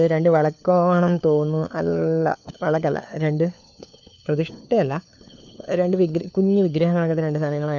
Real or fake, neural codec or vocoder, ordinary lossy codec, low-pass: fake; codec, 16 kHz, 4 kbps, FunCodec, trained on LibriTTS, 50 frames a second; none; 7.2 kHz